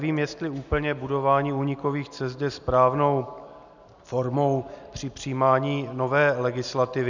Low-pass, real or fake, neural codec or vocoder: 7.2 kHz; real; none